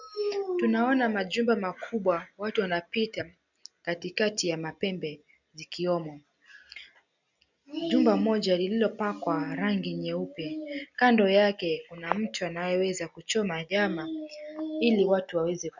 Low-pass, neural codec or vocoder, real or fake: 7.2 kHz; none; real